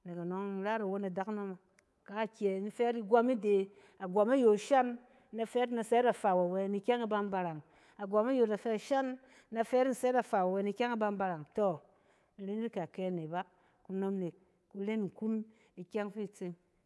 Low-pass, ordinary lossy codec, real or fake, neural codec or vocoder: none; none; fake; codec, 24 kHz, 3.1 kbps, DualCodec